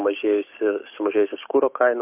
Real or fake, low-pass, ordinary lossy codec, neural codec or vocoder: fake; 3.6 kHz; AAC, 32 kbps; codec, 16 kHz, 6 kbps, DAC